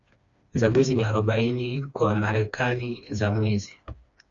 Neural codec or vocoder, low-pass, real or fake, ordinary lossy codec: codec, 16 kHz, 2 kbps, FreqCodec, smaller model; 7.2 kHz; fake; Opus, 64 kbps